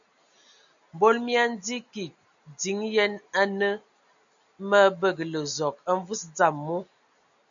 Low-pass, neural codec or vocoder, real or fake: 7.2 kHz; none; real